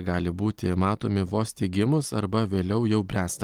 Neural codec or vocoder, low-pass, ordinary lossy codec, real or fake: none; 19.8 kHz; Opus, 24 kbps; real